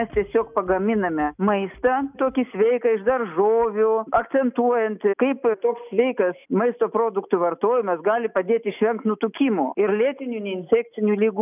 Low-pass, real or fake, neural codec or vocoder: 3.6 kHz; real; none